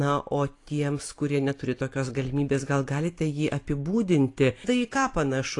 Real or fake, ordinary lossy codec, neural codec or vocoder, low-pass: fake; AAC, 48 kbps; vocoder, 44.1 kHz, 128 mel bands every 256 samples, BigVGAN v2; 10.8 kHz